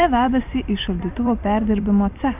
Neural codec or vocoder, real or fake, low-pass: vocoder, 44.1 kHz, 128 mel bands every 256 samples, BigVGAN v2; fake; 3.6 kHz